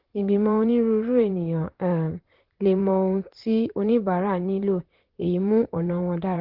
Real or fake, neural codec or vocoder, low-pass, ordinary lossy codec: fake; vocoder, 44.1 kHz, 128 mel bands, Pupu-Vocoder; 5.4 kHz; Opus, 16 kbps